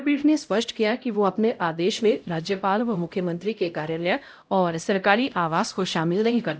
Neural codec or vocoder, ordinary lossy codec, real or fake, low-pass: codec, 16 kHz, 0.5 kbps, X-Codec, HuBERT features, trained on LibriSpeech; none; fake; none